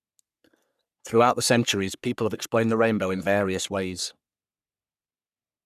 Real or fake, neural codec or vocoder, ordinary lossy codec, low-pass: fake; codec, 44.1 kHz, 3.4 kbps, Pupu-Codec; none; 14.4 kHz